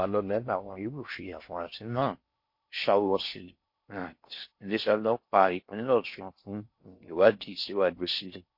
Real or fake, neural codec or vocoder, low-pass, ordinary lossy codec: fake; codec, 16 kHz in and 24 kHz out, 0.6 kbps, FocalCodec, streaming, 4096 codes; 5.4 kHz; MP3, 32 kbps